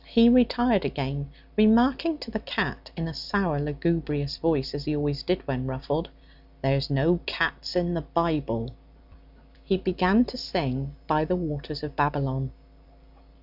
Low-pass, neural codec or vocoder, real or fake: 5.4 kHz; none; real